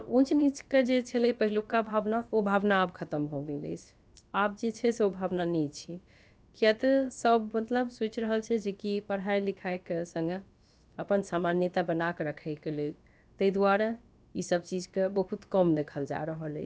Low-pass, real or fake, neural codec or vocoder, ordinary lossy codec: none; fake; codec, 16 kHz, about 1 kbps, DyCAST, with the encoder's durations; none